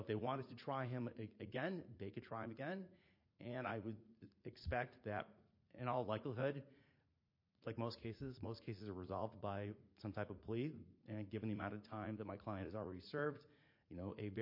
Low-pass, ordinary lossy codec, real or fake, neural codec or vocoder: 5.4 kHz; MP3, 32 kbps; fake; vocoder, 44.1 kHz, 80 mel bands, Vocos